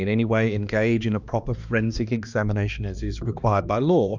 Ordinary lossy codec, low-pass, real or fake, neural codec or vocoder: Opus, 64 kbps; 7.2 kHz; fake; codec, 16 kHz, 2 kbps, X-Codec, HuBERT features, trained on balanced general audio